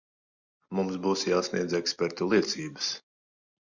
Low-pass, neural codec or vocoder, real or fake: 7.2 kHz; none; real